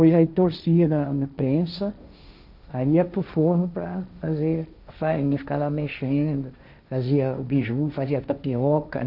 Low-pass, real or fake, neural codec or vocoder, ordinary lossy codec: 5.4 kHz; fake; codec, 16 kHz, 1.1 kbps, Voila-Tokenizer; none